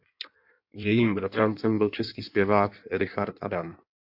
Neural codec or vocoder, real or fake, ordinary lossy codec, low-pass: codec, 16 kHz in and 24 kHz out, 1.1 kbps, FireRedTTS-2 codec; fake; AAC, 48 kbps; 5.4 kHz